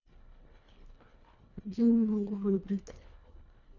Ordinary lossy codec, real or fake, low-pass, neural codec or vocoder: none; fake; 7.2 kHz; codec, 24 kHz, 1.5 kbps, HILCodec